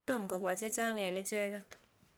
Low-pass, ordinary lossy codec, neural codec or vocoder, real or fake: none; none; codec, 44.1 kHz, 1.7 kbps, Pupu-Codec; fake